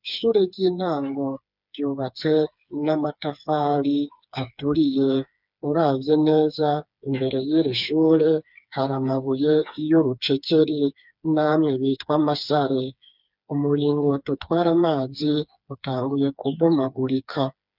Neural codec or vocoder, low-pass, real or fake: codec, 16 kHz, 4 kbps, FreqCodec, smaller model; 5.4 kHz; fake